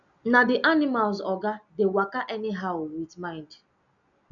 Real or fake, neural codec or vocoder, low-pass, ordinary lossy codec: real; none; 7.2 kHz; none